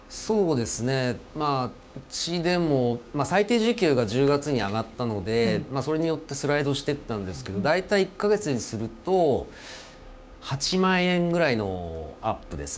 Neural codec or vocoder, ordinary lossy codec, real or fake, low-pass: codec, 16 kHz, 6 kbps, DAC; none; fake; none